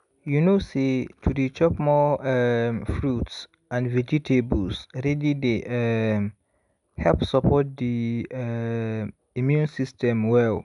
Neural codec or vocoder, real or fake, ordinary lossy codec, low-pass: none; real; none; 10.8 kHz